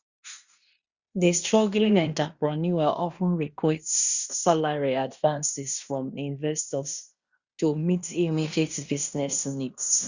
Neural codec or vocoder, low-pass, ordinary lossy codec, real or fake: codec, 16 kHz in and 24 kHz out, 0.9 kbps, LongCat-Audio-Codec, fine tuned four codebook decoder; 7.2 kHz; Opus, 64 kbps; fake